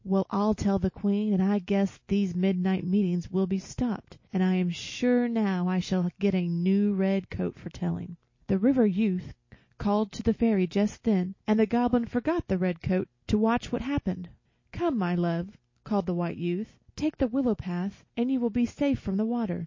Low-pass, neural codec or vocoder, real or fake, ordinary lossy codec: 7.2 kHz; none; real; MP3, 32 kbps